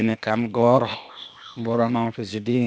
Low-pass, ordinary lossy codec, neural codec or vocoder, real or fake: none; none; codec, 16 kHz, 0.8 kbps, ZipCodec; fake